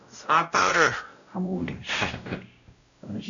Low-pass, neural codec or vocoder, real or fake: 7.2 kHz; codec, 16 kHz, 0.5 kbps, X-Codec, WavLM features, trained on Multilingual LibriSpeech; fake